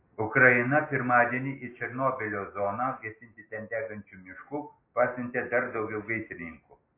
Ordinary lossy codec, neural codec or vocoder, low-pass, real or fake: AAC, 24 kbps; none; 3.6 kHz; real